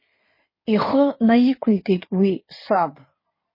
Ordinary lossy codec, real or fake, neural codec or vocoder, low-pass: MP3, 24 kbps; fake; codec, 16 kHz in and 24 kHz out, 2.2 kbps, FireRedTTS-2 codec; 5.4 kHz